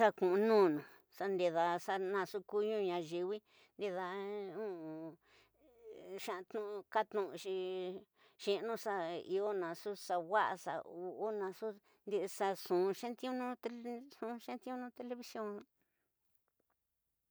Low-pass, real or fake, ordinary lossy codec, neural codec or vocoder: none; real; none; none